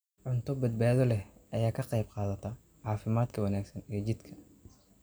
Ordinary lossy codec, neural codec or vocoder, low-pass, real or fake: none; none; none; real